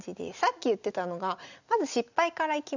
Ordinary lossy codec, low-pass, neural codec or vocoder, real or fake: none; 7.2 kHz; none; real